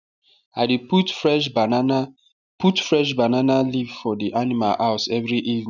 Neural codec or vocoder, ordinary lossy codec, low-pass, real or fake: none; none; 7.2 kHz; real